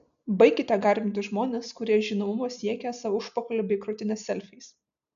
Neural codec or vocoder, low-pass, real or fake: none; 7.2 kHz; real